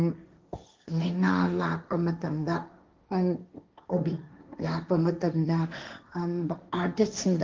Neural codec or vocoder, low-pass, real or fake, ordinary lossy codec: codec, 16 kHz, 1.1 kbps, Voila-Tokenizer; 7.2 kHz; fake; Opus, 32 kbps